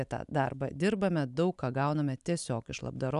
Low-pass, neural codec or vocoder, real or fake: 10.8 kHz; none; real